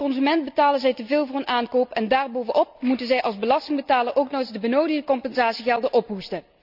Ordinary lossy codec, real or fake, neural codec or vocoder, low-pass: none; real; none; 5.4 kHz